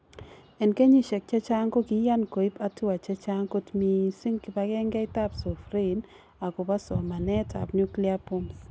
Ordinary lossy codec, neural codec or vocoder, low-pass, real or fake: none; none; none; real